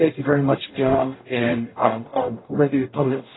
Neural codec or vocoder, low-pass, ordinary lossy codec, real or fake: codec, 44.1 kHz, 0.9 kbps, DAC; 7.2 kHz; AAC, 16 kbps; fake